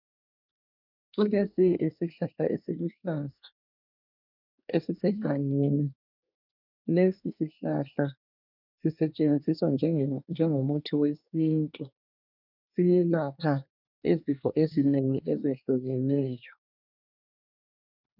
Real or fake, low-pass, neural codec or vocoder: fake; 5.4 kHz; codec, 24 kHz, 1 kbps, SNAC